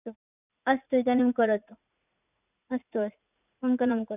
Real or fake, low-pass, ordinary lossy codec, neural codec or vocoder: fake; 3.6 kHz; none; vocoder, 44.1 kHz, 80 mel bands, Vocos